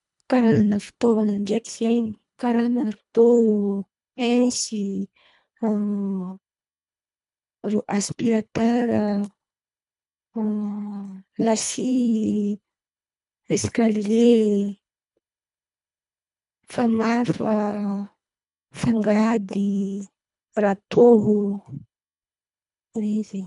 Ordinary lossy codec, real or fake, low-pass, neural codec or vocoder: MP3, 96 kbps; fake; 10.8 kHz; codec, 24 kHz, 1.5 kbps, HILCodec